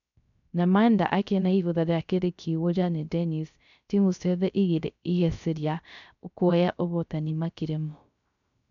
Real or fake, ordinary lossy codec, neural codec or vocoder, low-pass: fake; none; codec, 16 kHz, 0.3 kbps, FocalCodec; 7.2 kHz